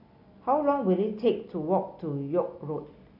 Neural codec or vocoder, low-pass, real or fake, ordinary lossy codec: none; 5.4 kHz; real; none